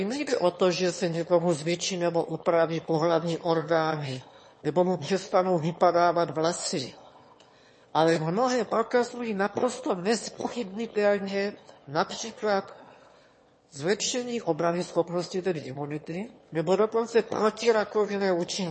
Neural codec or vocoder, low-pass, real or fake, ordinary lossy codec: autoencoder, 22.05 kHz, a latent of 192 numbers a frame, VITS, trained on one speaker; 9.9 kHz; fake; MP3, 32 kbps